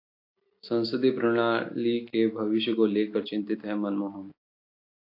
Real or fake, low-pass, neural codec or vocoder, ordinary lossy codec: real; 5.4 kHz; none; AAC, 32 kbps